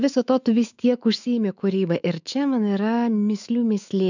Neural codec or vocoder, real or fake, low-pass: autoencoder, 48 kHz, 128 numbers a frame, DAC-VAE, trained on Japanese speech; fake; 7.2 kHz